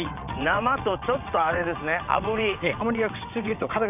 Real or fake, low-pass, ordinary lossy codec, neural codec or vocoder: fake; 3.6 kHz; none; vocoder, 22.05 kHz, 80 mel bands, WaveNeXt